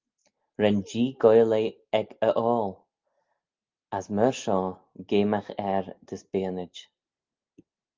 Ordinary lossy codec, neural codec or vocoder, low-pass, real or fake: Opus, 32 kbps; none; 7.2 kHz; real